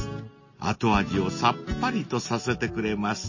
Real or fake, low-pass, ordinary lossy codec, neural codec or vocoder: real; 7.2 kHz; MP3, 32 kbps; none